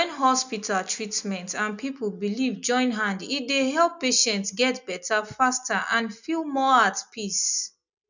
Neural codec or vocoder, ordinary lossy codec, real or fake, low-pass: none; none; real; 7.2 kHz